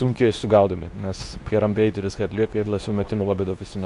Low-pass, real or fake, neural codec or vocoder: 10.8 kHz; fake; codec, 24 kHz, 0.9 kbps, WavTokenizer, medium speech release version 2